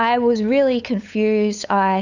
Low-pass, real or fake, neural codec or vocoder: 7.2 kHz; fake; codec, 16 kHz, 8 kbps, FunCodec, trained on LibriTTS, 25 frames a second